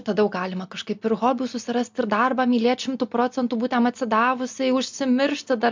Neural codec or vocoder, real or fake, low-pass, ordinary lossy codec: none; real; 7.2 kHz; MP3, 64 kbps